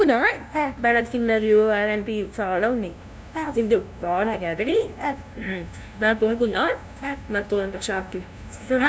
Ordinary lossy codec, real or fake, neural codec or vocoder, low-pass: none; fake; codec, 16 kHz, 0.5 kbps, FunCodec, trained on LibriTTS, 25 frames a second; none